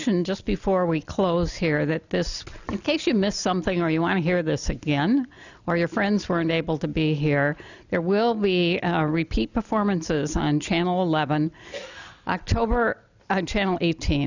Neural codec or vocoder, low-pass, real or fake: none; 7.2 kHz; real